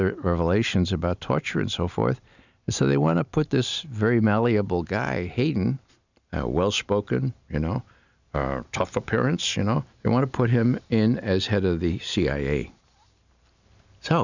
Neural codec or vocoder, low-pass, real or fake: none; 7.2 kHz; real